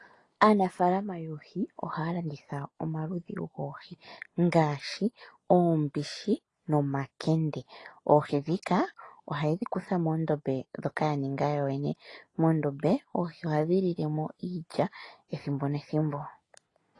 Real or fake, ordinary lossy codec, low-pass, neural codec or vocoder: real; AAC, 32 kbps; 10.8 kHz; none